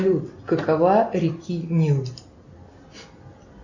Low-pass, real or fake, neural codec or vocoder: 7.2 kHz; real; none